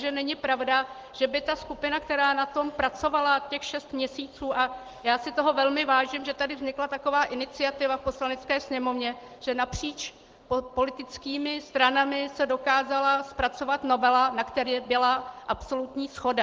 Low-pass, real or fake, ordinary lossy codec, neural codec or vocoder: 7.2 kHz; real; Opus, 16 kbps; none